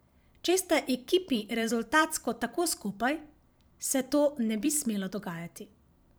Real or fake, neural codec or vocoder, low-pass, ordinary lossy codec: fake; vocoder, 44.1 kHz, 128 mel bands every 512 samples, BigVGAN v2; none; none